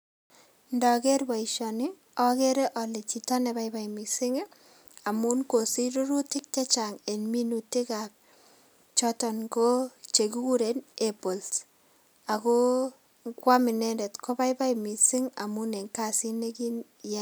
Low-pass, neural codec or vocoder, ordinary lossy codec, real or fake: none; none; none; real